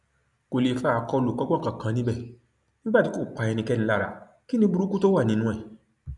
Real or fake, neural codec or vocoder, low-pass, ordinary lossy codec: real; none; 10.8 kHz; none